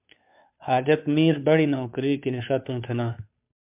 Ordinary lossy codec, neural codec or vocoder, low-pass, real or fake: MP3, 32 kbps; codec, 16 kHz, 2 kbps, FunCodec, trained on Chinese and English, 25 frames a second; 3.6 kHz; fake